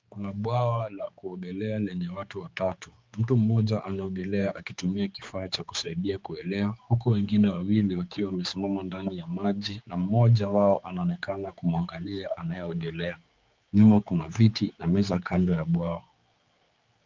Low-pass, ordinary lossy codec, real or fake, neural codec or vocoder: 7.2 kHz; Opus, 24 kbps; fake; codec, 16 kHz, 4 kbps, X-Codec, HuBERT features, trained on general audio